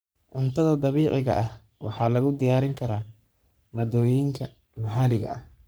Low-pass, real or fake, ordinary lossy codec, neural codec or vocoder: none; fake; none; codec, 44.1 kHz, 3.4 kbps, Pupu-Codec